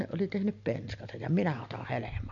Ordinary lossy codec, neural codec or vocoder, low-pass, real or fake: MP3, 48 kbps; none; 7.2 kHz; real